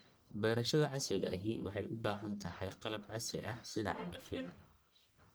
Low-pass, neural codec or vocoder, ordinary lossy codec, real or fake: none; codec, 44.1 kHz, 1.7 kbps, Pupu-Codec; none; fake